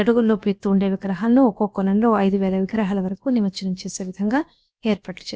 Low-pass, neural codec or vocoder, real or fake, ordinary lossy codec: none; codec, 16 kHz, about 1 kbps, DyCAST, with the encoder's durations; fake; none